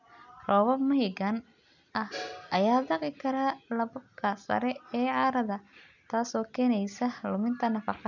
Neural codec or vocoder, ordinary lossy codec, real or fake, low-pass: none; none; real; 7.2 kHz